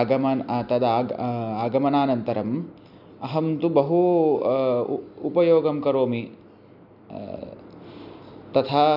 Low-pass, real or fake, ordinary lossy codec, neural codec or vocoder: 5.4 kHz; real; none; none